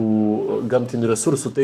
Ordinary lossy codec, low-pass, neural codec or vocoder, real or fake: AAC, 96 kbps; 14.4 kHz; codec, 44.1 kHz, 7.8 kbps, Pupu-Codec; fake